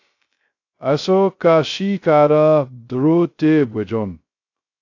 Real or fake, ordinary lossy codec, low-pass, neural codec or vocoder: fake; AAC, 48 kbps; 7.2 kHz; codec, 16 kHz, 0.2 kbps, FocalCodec